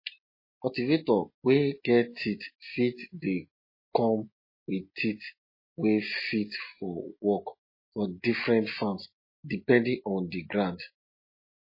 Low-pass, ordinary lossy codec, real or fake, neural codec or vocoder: 5.4 kHz; MP3, 24 kbps; real; none